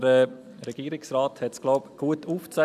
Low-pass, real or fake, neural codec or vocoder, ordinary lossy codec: 14.4 kHz; real; none; none